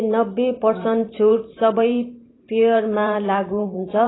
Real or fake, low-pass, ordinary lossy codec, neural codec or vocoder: fake; 7.2 kHz; AAC, 16 kbps; vocoder, 44.1 kHz, 128 mel bands every 512 samples, BigVGAN v2